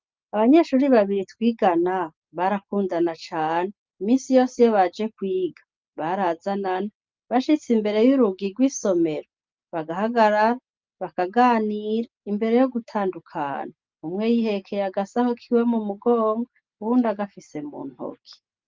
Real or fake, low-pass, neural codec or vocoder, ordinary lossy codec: real; 7.2 kHz; none; Opus, 32 kbps